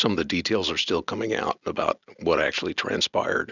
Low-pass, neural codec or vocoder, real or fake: 7.2 kHz; none; real